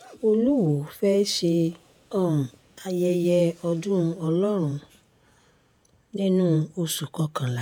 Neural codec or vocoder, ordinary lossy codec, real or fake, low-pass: vocoder, 48 kHz, 128 mel bands, Vocos; none; fake; none